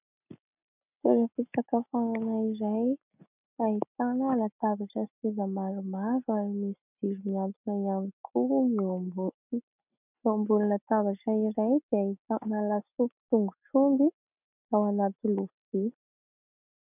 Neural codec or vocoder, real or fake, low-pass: none; real; 3.6 kHz